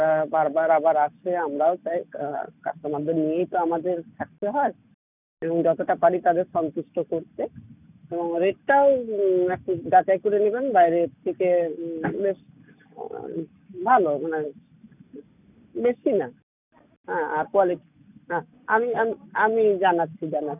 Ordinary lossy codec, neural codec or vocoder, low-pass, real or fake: none; none; 3.6 kHz; real